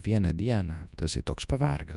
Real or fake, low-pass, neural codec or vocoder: fake; 10.8 kHz; codec, 24 kHz, 0.9 kbps, WavTokenizer, large speech release